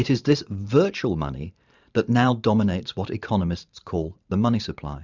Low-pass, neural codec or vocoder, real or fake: 7.2 kHz; vocoder, 44.1 kHz, 128 mel bands every 512 samples, BigVGAN v2; fake